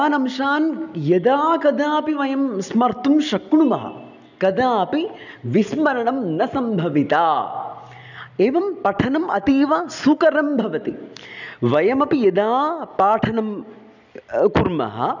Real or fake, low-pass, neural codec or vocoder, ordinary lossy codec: real; 7.2 kHz; none; none